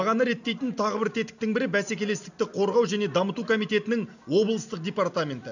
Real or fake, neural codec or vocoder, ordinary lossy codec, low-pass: real; none; none; 7.2 kHz